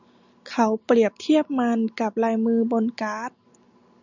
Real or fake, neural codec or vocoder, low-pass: real; none; 7.2 kHz